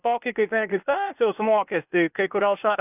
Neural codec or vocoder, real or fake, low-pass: codec, 16 kHz, 0.8 kbps, ZipCodec; fake; 3.6 kHz